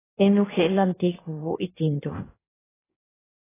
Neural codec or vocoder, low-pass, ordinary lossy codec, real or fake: codec, 16 kHz in and 24 kHz out, 1.1 kbps, FireRedTTS-2 codec; 3.6 kHz; AAC, 16 kbps; fake